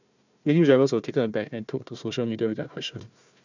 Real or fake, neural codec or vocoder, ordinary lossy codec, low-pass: fake; codec, 16 kHz, 1 kbps, FunCodec, trained on Chinese and English, 50 frames a second; none; 7.2 kHz